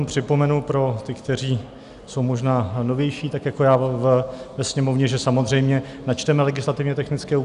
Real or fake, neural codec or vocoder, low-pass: real; none; 10.8 kHz